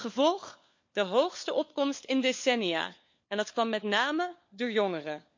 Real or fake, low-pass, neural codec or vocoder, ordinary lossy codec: fake; 7.2 kHz; codec, 16 kHz, 4 kbps, FunCodec, trained on LibriTTS, 50 frames a second; MP3, 48 kbps